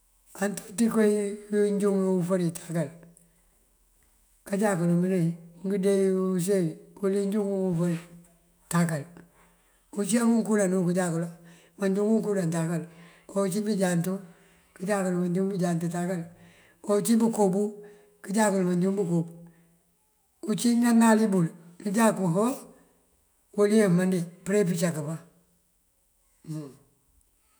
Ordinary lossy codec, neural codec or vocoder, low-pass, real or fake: none; autoencoder, 48 kHz, 128 numbers a frame, DAC-VAE, trained on Japanese speech; none; fake